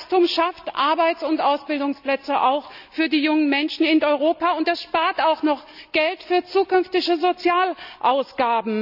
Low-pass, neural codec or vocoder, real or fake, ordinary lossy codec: 5.4 kHz; none; real; none